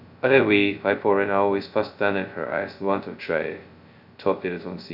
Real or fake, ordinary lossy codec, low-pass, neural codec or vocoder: fake; none; 5.4 kHz; codec, 16 kHz, 0.2 kbps, FocalCodec